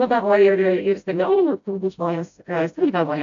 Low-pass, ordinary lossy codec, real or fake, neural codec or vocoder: 7.2 kHz; AAC, 64 kbps; fake; codec, 16 kHz, 0.5 kbps, FreqCodec, smaller model